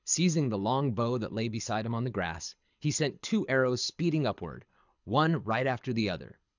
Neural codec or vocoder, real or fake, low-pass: codec, 24 kHz, 6 kbps, HILCodec; fake; 7.2 kHz